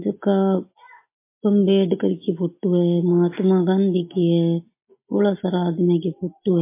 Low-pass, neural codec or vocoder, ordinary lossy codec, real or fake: 3.6 kHz; none; MP3, 24 kbps; real